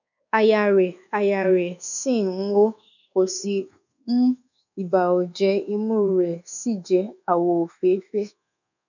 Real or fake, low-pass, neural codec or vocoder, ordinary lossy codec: fake; 7.2 kHz; codec, 24 kHz, 1.2 kbps, DualCodec; none